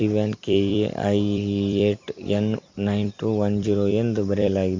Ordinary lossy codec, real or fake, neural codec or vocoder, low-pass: MP3, 48 kbps; fake; vocoder, 44.1 kHz, 128 mel bands every 256 samples, BigVGAN v2; 7.2 kHz